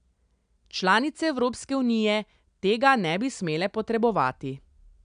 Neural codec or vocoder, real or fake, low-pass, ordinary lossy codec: none; real; 9.9 kHz; none